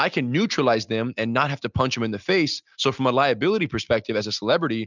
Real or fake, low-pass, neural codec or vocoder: real; 7.2 kHz; none